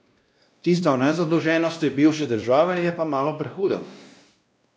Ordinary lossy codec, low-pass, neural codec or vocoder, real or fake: none; none; codec, 16 kHz, 1 kbps, X-Codec, WavLM features, trained on Multilingual LibriSpeech; fake